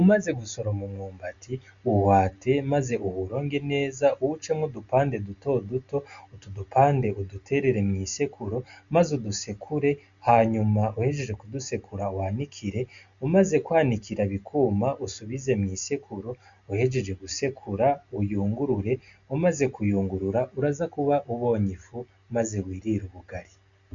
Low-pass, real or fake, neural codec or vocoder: 7.2 kHz; real; none